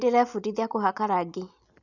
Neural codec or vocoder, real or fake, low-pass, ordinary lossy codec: none; real; 7.2 kHz; none